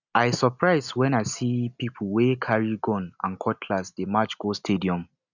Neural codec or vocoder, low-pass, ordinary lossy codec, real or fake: none; 7.2 kHz; none; real